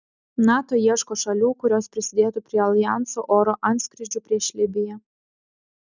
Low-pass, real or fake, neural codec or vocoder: 7.2 kHz; real; none